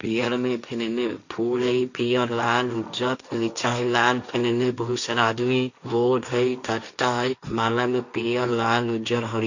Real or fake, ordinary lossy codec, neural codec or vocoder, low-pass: fake; none; codec, 16 kHz, 1.1 kbps, Voila-Tokenizer; 7.2 kHz